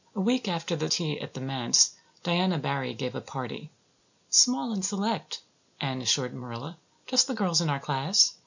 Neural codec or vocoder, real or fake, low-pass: none; real; 7.2 kHz